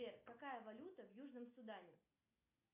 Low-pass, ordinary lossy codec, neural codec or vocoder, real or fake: 3.6 kHz; MP3, 32 kbps; none; real